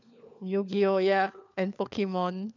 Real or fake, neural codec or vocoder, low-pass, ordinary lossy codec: fake; codec, 16 kHz, 4 kbps, FunCodec, trained on LibriTTS, 50 frames a second; 7.2 kHz; none